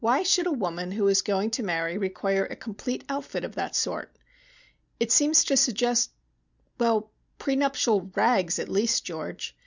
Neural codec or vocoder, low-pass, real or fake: none; 7.2 kHz; real